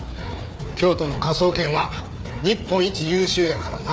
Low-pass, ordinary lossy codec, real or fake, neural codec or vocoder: none; none; fake; codec, 16 kHz, 4 kbps, FreqCodec, larger model